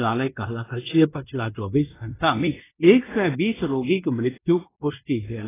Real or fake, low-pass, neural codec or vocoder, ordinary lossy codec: fake; 3.6 kHz; codec, 16 kHz in and 24 kHz out, 0.9 kbps, LongCat-Audio-Codec, fine tuned four codebook decoder; AAC, 16 kbps